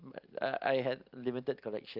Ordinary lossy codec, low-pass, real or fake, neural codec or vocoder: Opus, 32 kbps; 5.4 kHz; real; none